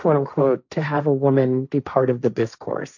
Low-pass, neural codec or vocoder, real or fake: 7.2 kHz; codec, 16 kHz, 1.1 kbps, Voila-Tokenizer; fake